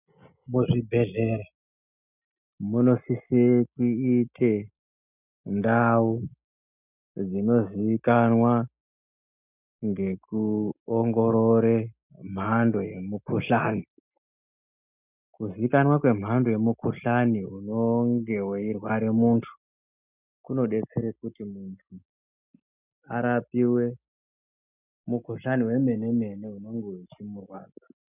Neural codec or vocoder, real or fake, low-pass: none; real; 3.6 kHz